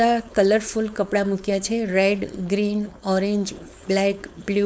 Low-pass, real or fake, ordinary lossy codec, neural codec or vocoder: none; fake; none; codec, 16 kHz, 4.8 kbps, FACodec